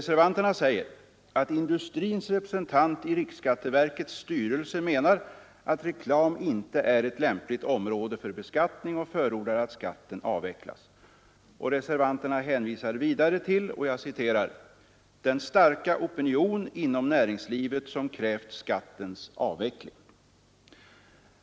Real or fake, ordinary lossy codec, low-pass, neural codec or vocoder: real; none; none; none